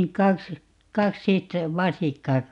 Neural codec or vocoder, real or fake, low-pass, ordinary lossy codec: none; real; 10.8 kHz; none